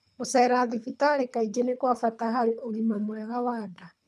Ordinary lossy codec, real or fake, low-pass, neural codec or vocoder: none; fake; none; codec, 24 kHz, 3 kbps, HILCodec